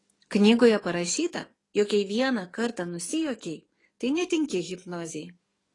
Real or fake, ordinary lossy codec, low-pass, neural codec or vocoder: fake; AAC, 32 kbps; 10.8 kHz; codec, 44.1 kHz, 7.8 kbps, DAC